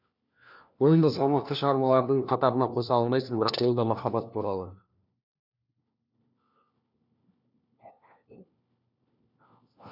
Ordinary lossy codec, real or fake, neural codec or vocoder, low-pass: none; fake; codec, 16 kHz, 1 kbps, FunCodec, trained on LibriTTS, 50 frames a second; 5.4 kHz